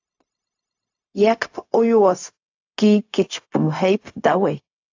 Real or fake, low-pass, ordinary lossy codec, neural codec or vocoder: fake; 7.2 kHz; AAC, 48 kbps; codec, 16 kHz, 0.4 kbps, LongCat-Audio-Codec